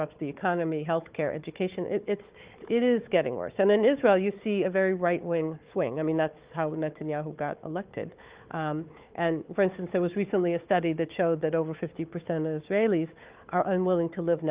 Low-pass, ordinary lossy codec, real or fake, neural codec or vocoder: 3.6 kHz; Opus, 32 kbps; fake; codec, 16 kHz, 4 kbps, FunCodec, trained on Chinese and English, 50 frames a second